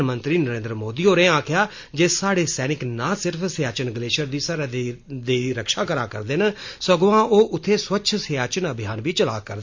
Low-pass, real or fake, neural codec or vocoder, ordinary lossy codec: 7.2 kHz; real; none; MP3, 48 kbps